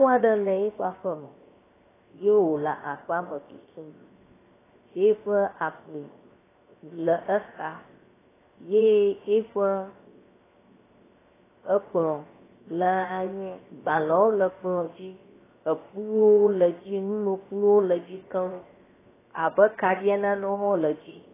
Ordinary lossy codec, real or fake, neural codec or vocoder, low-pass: AAC, 16 kbps; fake; codec, 16 kHz, 0.7 kbps, FocalCodec; 3.6 kHz